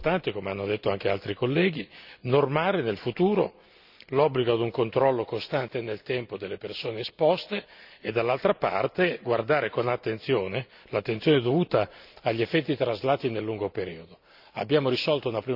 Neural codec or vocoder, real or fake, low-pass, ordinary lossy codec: none; real; 5.4 kHz; none